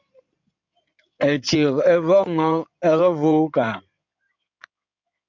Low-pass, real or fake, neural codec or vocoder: 7.2 kHz; fake; codec, 16 kHz in and 24 kHz out, 2.2 kbps, FireRedTTS-2 codec